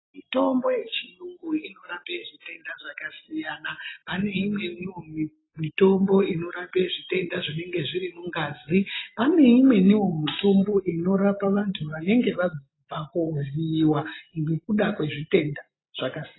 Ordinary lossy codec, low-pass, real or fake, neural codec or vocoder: AAC, 16 kbps; 7.2 kHz; real; none